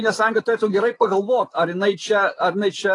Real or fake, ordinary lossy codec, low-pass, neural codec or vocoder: real; AAC, 32 kbps; 10.8 kHz; none